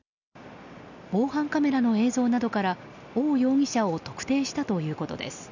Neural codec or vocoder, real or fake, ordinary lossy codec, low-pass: none; real; none; 7.2 kHz